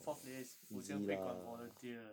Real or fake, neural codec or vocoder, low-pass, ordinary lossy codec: real; none; none; none